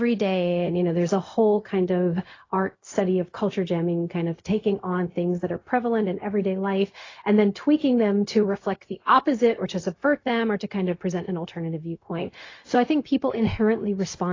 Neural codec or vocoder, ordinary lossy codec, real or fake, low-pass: codec, 16 kHz, 0.4 kbps, LongCat-Audio-Codec; AAC, 32 kbps; fake; 7.2 kHz